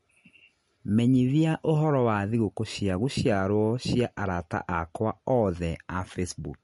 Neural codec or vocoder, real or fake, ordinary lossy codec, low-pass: none; real; MP3, 48 kbps; 14.4 kHz